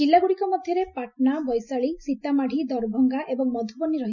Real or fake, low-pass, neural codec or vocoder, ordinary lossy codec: real; 7.2 kHz; none; none